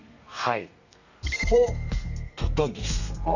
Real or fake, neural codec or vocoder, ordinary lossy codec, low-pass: fake; codec, 32 kHz, 1.9 kbps, SNAC; none; 7.2 kHz